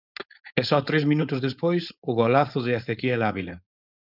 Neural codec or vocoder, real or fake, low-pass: codec, 16 kHz, 4.8 kbps, FACodec; fake; 5.4 kHz